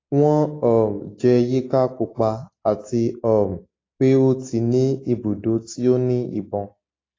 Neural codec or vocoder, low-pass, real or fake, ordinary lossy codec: none; 7.2 kHz; real; AAC, 32 kbps